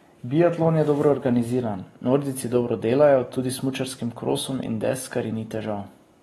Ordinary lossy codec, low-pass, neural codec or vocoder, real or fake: AAC, 32 kbps; 19.8 kHz; none; real